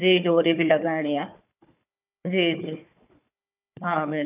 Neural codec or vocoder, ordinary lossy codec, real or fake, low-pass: codec, 16 kHz, 4 kbps, FunCodec, trained on Chinese and English, 50 frames a second; none; fake; 3.6 kHz